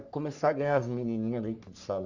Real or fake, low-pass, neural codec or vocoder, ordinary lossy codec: fake; 7.2 kHz; codec, 44.1 kHz, 3.4 kbps, Pupu-Codec; none